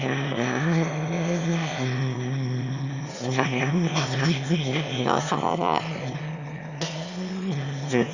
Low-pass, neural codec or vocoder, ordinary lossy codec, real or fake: 7.2 kHz; autoencoder, 22.05 kHz, a latent of 192 numbers a frame, VITS, trained on one speaker; Opus, 64 kbps; fake